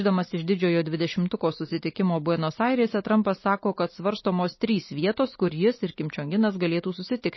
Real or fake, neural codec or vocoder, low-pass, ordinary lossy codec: real; none; 7.2 kHz; MP3, 24 kbps